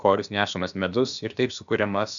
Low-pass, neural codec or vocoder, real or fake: 7.2 kHz; codec, 16 kHz, about 1 kbps, DyCAST, with the encoder's durations; fake